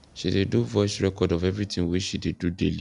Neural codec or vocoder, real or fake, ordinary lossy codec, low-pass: none; real; none; 10.8 kHz